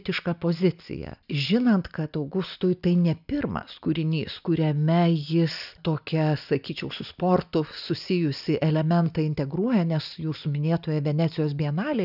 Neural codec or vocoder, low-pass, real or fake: none; 5.4 kHz; real